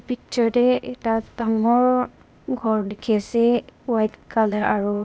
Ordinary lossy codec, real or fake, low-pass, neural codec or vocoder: none; fake; none; codec, 16 kHz, 0.8 kbps, ZipCodec